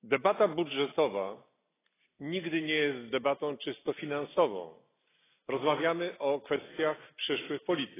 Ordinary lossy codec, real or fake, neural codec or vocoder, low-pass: AAC, 16 kbps; real; none; 3.6 kHz